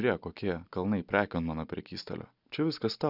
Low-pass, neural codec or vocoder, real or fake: 5.4 kHz; none; real